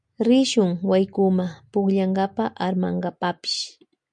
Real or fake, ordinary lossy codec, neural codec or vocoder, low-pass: real; MP3, 96 kbps; none; 9.9 kHz